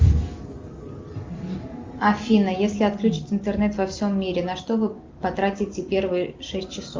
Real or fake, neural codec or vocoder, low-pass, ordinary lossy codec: real; none; 7.2 kHz; Opus, 32 kbps